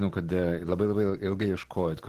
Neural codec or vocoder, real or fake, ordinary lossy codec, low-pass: none; real; Opus, 16 kbps; 14.4 kHz